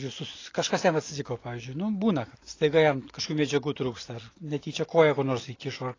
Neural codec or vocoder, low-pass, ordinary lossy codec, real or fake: none; 7.2 kHz; AAC, 32 kbps; real